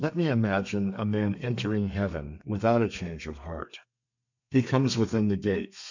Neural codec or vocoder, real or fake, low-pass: codec, 32 kHz, 1.9 kbps, SNAC; fake; 7.2 kHz